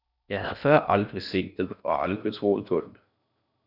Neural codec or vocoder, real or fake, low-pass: codec, 16 kHz in and 24 kHz out, 0.6 kbps, FocalCodec, streaming, 4096 codes; fake; 5.4 kHz